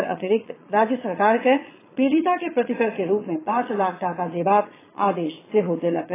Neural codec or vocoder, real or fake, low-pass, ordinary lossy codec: vocoder, 22.05 kHz, 80 mel bands, Vocos; fake; 3.6 kHz; AAC, 16 kbps